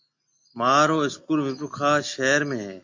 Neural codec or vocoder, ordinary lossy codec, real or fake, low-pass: none; MP3, 48 kbps; real; 7.2 kHz